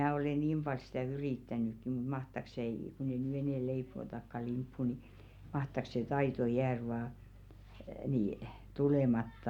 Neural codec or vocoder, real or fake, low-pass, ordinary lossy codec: none; real; 19.8 kHz; none